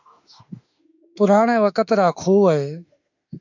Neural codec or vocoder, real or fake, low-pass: autoencoder, 48 kHz, 32 numbers a frame, DAC-VAE, trained on Japanese speech; fake; 7.2 kHz